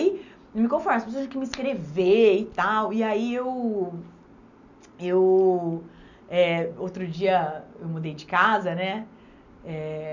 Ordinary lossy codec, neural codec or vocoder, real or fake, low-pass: none; none; real; 7.2 kHz